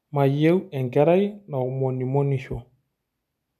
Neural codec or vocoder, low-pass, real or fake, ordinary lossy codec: none; 14.4 kHz; real; none